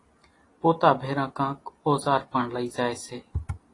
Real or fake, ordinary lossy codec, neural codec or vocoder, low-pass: real; AAC, 32 kbps; none; 10.8 kHz